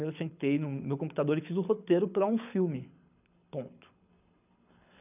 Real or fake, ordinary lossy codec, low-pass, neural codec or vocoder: fake; none; 3.6 kHz; codec, 24 kHz, 6 kbps, HILCodec